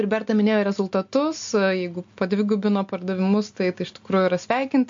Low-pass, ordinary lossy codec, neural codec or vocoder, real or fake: 7.2 kHz; MP3, 48 kbps; none; real